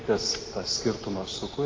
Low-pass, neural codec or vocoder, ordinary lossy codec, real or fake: 7.2 kHz; none; Opus, 16 kbps; real